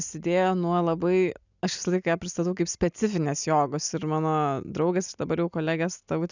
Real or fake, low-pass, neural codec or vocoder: real; 7.2 kHz; none